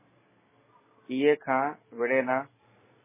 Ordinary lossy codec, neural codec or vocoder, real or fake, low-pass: MP3, 16 kbps; vocoder, 44.1 kHz, 128 mel bands every 256 samples, BigVGAN v2; fake; 3.6 kHz